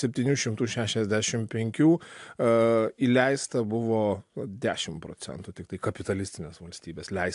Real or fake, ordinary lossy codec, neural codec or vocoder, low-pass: real; AAC, 64 kbps; none; 10.8 kHz